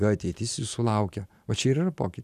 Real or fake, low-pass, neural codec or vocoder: real; 14.4 kHz; none